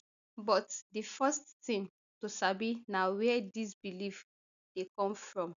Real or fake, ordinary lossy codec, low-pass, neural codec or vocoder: real; none; 7.2 kHz; none